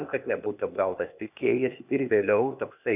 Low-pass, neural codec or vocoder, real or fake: 3.6 kHz; codec, 16 kHz, 0.8 kbps, ZipCodec; fake